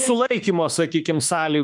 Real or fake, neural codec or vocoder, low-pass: fake; autoencoder, 48 kHz, 32 numbers a frame, DAC-VAE, trained on Japanese speech; 10.8 kHz